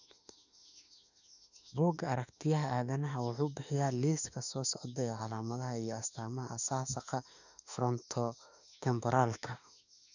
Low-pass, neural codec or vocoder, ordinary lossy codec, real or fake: 7.2 kHz; autoencoder, 48 kHz, 32 numbers a frame, DAC-VAE, trained on Japanese speech; none; fake